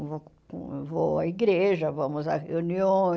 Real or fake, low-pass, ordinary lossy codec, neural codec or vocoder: real; none; none; none